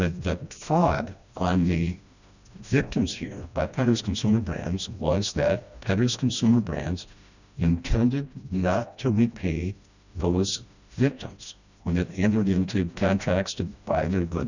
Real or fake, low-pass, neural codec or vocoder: fake; 7.2 kHz; codec, 16 kHz, 1 kbps, FreqCodec, smaller model